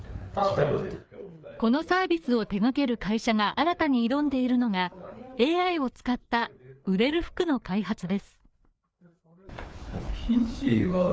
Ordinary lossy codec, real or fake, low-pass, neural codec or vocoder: none; fake; none; codec, 16 kHz, 4 kbps, FreqCodec, larger model